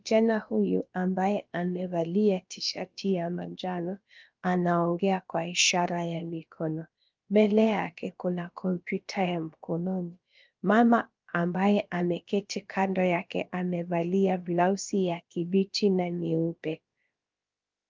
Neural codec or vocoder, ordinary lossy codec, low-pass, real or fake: codec, 16 kHz, about 1 kbps, DyCAST, with the encoder's durations; Opus, 24 kbps; 7.2 kHz; fake